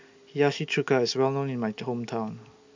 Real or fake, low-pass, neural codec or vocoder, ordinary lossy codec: real; 7.2 kHz; none; MP3, 64 kbps